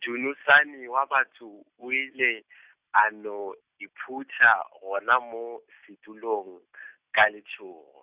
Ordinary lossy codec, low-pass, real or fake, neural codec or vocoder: Opus, 64 kbps; 3.6 kHz; real; none